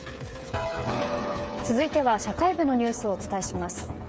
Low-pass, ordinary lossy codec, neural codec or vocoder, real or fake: none; none; codec, 16 kHz, 8 kbps, FreqCodec, smaller model; fake